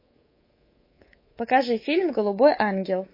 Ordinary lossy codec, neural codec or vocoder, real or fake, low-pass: MP3, 24 kbps; codec, 24 kHz, 3.1 kbps, DualCodec; fake; 5.4 kHz